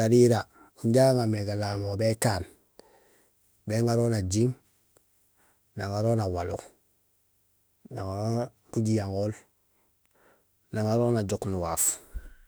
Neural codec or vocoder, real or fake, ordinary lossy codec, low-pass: autoencoder, 48 kHz, 32 numbers a frame, DAC-VAE, trained on Japanese speech; fake; none; none